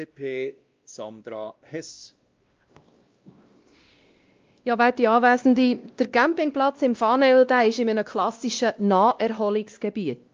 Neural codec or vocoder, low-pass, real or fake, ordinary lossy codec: codec, 16 kHz, 1 kbps, X-Codec, WavLM features, trained on Multilingual LibriSpeech; 7.2 kHz; fake; Opus, 24 kbps